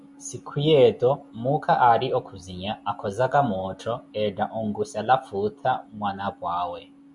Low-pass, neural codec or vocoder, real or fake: 10.8 kHz; none; real